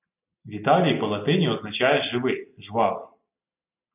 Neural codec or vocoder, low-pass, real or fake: none; 3.6 kHz; real